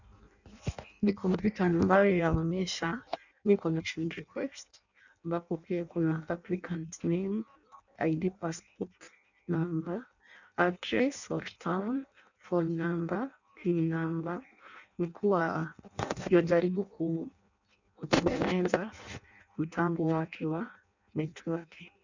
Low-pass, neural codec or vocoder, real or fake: 7.2 kHz; codec, 16 kHz in and 24 kHz out, 0.6 kbps, FireRedTTS-2 codec; fake